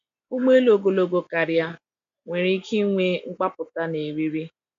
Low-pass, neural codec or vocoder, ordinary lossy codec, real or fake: 7.2 kHz; none; AAC, 64 kbps; real